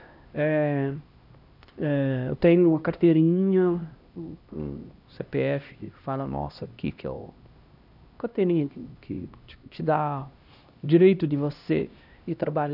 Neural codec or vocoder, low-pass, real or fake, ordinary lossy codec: codec, 16 kHz, 1 kbps, X-Codec, HuBERT features, trained on LibriSpeech; 5.4 kHz; fake; none